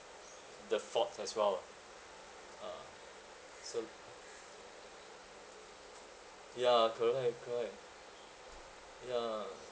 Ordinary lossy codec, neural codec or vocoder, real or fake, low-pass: none; none; real; none